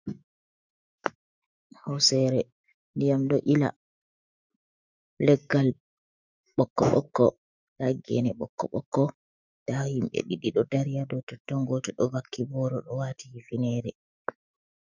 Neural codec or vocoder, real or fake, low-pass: autoencoder, 48 kHz, 128 numbers a frame, DAC-VAE, trained on Japanese speech; fake; 7.2 kHz